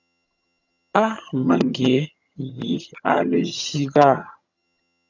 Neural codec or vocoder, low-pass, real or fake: vocoder, 22.05 kHz, 80 mel bands, HiFi-GAN; 7.2 kHz; fake